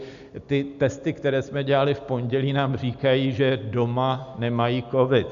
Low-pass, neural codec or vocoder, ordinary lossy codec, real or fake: 7.2 kHz; none; MP3, 96 kbps; real